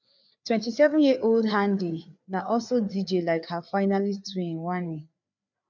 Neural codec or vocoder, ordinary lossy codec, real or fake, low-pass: codec, 16 kHz, 4 kbps, FreqCodec, larger model; none; fake; 7.2 kHz